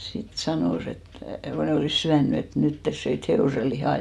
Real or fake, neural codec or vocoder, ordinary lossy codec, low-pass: real; none; none; none